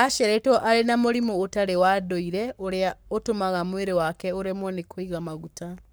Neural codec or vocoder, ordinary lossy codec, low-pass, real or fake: codec, 44.1 kHz, 7.8 kbps, Pupu-Codec; none; none; fake